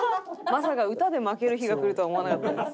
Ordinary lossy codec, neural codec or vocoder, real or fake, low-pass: none; none; real; none